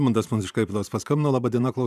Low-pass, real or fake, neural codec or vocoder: 14.4 kHz; fake; vocoder, 44.1 kHz, 128 mel bands every 512 samples, BigVGAN v2